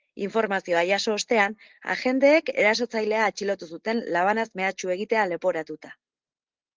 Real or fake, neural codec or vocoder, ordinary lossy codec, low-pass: real; none; Opus, 16 kbps; 7.2 kHz